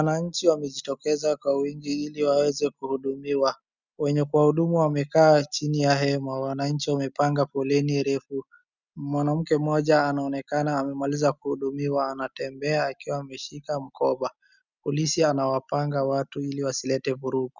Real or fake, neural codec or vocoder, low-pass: real; none; 7.2 kHz